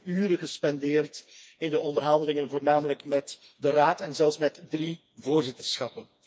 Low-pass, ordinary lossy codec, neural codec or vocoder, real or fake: none; none; codec, 16 kHz, 2 kbps, FreqCodec, smaller model; fake